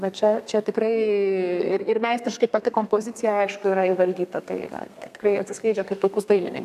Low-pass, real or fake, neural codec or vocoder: 14.4 kHz; fake; codec, 32 kHz, 1.9 kbps, SNAC